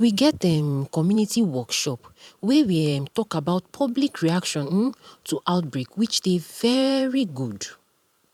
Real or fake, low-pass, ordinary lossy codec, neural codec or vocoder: fake; 19.8 kHz; none; vocoder, 44.1 kHz, 128 mel bands every 512 samples, BigVGAN v2